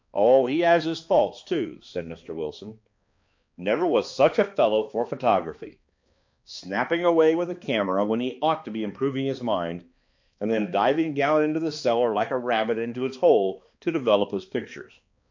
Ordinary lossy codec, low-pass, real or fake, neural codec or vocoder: MP3, 48 kbps; 7.2 kHz; fake; codec, 16 kHz, 2 kbps, X-Codec, HuBERT features, trained on balanced general audio